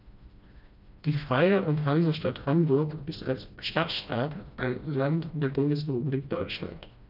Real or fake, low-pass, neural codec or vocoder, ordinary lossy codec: fake; 5.4 kHz; codec, 16 kHz, 1 kbps, FreqCodec, smaller model; none